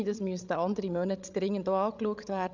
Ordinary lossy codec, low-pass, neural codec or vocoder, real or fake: none; 7.2 kHz; codec, 16 kHz, 16 kbps, FreqCodec, larger model; fake